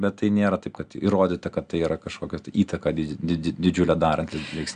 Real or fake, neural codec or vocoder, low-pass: real; none; 9.9 kHz